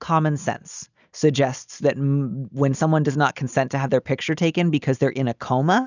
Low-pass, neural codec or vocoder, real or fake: 7.2 kHz; none; real